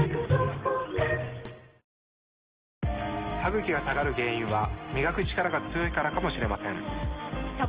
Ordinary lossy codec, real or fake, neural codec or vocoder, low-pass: Opus, 16 kbps; real; none; 3.6 kHz